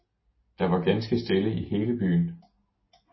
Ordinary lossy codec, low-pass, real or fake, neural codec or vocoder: MP3, 24 kbps; 7.2 kHz; real; none